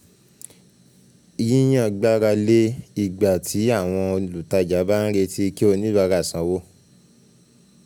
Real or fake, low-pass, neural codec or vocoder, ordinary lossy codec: real; 19.8 kHz; none; none